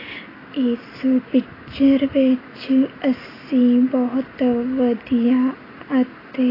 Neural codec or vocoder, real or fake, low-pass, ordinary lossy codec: none; real; 5.4 kHz; AAC, 24 kbps